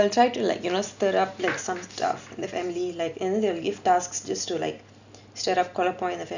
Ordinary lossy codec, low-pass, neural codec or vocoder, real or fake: none; 7.2 kHz; none; real